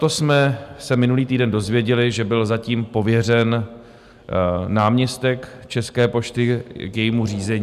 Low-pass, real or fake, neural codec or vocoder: 14.4 kHz; real; none